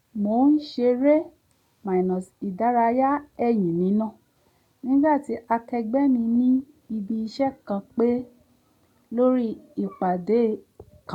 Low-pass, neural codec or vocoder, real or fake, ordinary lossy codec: 19.8 kHz; none; real; none